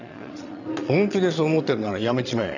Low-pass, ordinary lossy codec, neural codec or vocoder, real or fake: 7.2 kHz; none; vocoder, 22.05 kHz, 80 mel bands, Vocos; fake